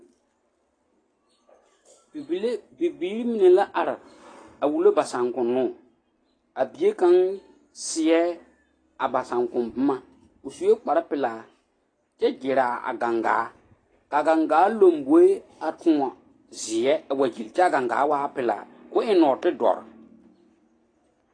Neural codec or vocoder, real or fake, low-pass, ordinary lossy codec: none; real; 9.9 kHz; AAC, 32 kbps